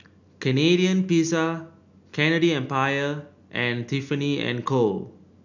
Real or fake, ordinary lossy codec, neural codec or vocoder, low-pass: real; none; none; 7.2 kHz